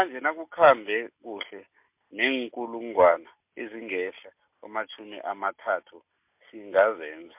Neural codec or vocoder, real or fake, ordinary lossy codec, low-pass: none; real; MP3, 32 kbps; 3.6 kHz